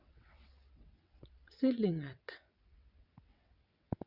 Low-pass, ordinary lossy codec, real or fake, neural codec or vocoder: 5.4 kHz; none; fake; vocoder, 22.05 kHz, 80 mel bands, WaveNeXt